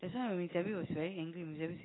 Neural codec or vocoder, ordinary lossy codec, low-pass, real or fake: none; AAC, 16 kbps; 7.2 kHz; real